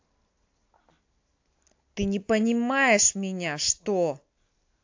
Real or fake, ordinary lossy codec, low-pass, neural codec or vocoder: real; AAC, 48 kbps; 7.2 kHz; none